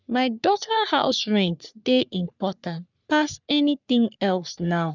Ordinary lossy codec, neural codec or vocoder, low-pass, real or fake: none; codec, 44.1 kHz, 3.4 kbps, Pupu-Codec; 7.2 kHz; fake